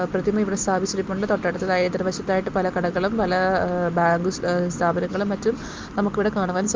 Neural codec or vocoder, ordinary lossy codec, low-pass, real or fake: none; Opus, 32 kbps; 7.2 kHz; real